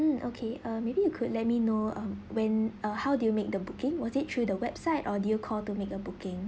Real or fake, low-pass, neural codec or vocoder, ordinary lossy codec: real; none; none; none